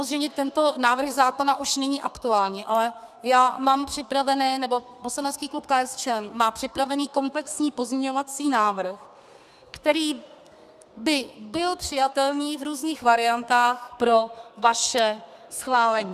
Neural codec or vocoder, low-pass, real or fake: codec, 32 kHz, 1.9 kbps, SNAC; 14.4 kHz; fake